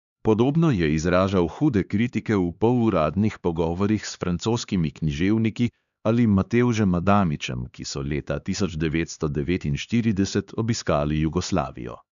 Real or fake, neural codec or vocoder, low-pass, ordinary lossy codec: fake; codec, 16 kHz, 2 kbps, X-Codec, HuBERT features, trained on LibriSpeech; 7.2 kHz; none